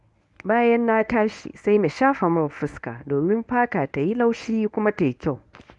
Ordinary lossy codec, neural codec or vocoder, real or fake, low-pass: none; codec, 24 kHz, 0.9 kbps, WavTokenizer, medium speech release version 1; fake; 10.8 kHz